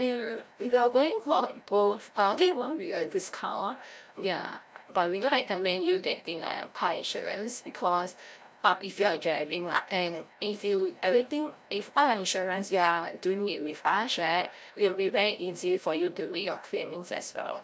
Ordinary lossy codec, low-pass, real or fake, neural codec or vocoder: none; none; fake; codec, 16 kHz, 0.5 kbps, FreqCodec, larger model